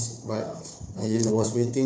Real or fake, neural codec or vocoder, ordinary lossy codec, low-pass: fake; codec, 16 kHz, 4 kbps, FunCodec, trained on Chinese and English, 50 frames a second; none; none